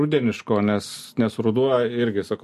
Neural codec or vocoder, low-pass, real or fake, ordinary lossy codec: none; 14.4 kHz; real; MP3, 64 kbps